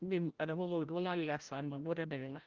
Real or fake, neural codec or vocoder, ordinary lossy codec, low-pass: fake; codec, 16 kHz, 0.5 kbps, FreqCodec, larger model; Opus, 32 kbps; 7.2 kHz